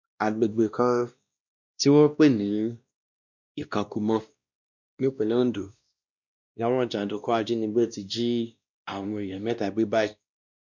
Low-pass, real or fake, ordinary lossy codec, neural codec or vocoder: 7.2 kHz; fake; none; codec, 16 kHz, 1 kbps, X-Codec, WavLM features, trained on Multilingual LibriSpeech